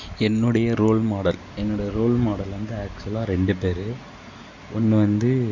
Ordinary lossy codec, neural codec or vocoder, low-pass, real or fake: none; none; 7.2 kHz; real